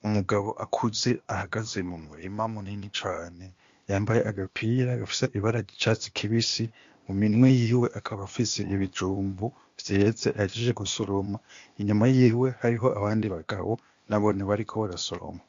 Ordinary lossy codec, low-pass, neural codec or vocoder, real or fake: MP3, 48 kbps; 7.2 kHz; codec, 16 kHz, 0.8 kbps, ZipCodec; fake